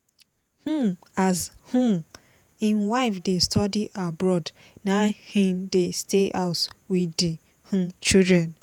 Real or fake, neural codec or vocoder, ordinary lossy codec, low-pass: fake; vocoder, 48 kHz, 128 mel bands, Vocos; none; 19.8 kHz